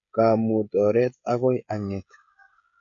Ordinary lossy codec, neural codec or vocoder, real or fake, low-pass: none; codec, 16 kHz, 16 kbps, FreqCodec, smaller model; fake; 7.2 kHz